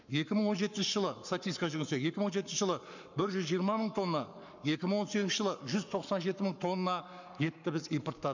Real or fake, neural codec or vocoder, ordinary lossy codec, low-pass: fake; codec, 44.1 kHz, 7.8 kbps, Pupu-Codec; none; 7.2 kHz